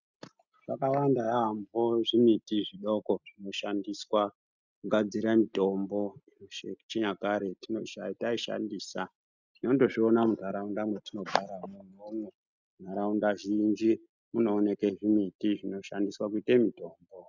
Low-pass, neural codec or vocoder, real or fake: 7.2 kHz; none; real